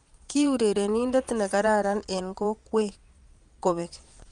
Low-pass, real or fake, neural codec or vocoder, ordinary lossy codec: 9.9 kHz; fake; vocoder, 22.05 kHz, 80 mel bands, Vocos; Opus, 32 kbps